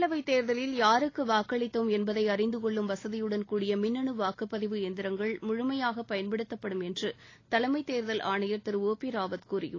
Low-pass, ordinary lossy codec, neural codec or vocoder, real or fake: 7.2 kHz; AAC, 32 kbps; none; real